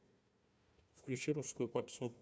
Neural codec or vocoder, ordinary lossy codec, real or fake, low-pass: codec, 16 kHz, 1 kbps, FunCodec, trained on Chinese and English, 50 frames a second; none; fake; none